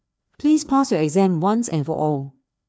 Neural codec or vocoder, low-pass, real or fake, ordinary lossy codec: codec, 16 kHz, 2 kbps, FreqCodec, larger model; none; fake; none